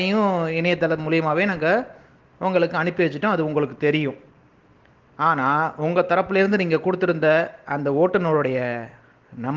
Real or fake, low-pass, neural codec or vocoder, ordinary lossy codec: real; 7.2 kHz; none; Opus, 16 kbps